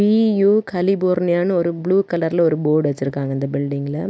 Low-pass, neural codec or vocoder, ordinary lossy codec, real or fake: none; none; none; real